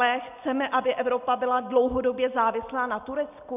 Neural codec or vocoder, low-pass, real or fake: none; 3.6 kHz; real